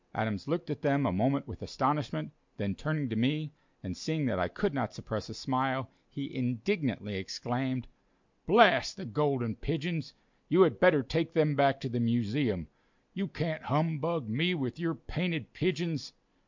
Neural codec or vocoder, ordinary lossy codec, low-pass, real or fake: none; MP3, 64 kbps; 7.2 kHz; real